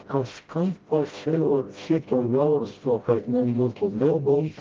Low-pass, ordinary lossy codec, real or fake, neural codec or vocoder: 7.2 kHz; Opus, 32 kbps; fake; codec, 16 kHz, 0.5 kbps, FreqCodec, smaller model